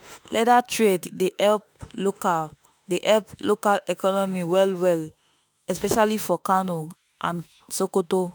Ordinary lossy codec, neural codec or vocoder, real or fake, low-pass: none; autoencoder, 48 kHz, 32 numbers a frame, DAC-VAE, trained on Japanese speech; fake; none